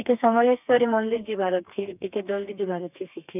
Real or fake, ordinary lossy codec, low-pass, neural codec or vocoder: fake; none; 3.6 kHz; codec, 32 kHz, 1.9 kbps, SNAC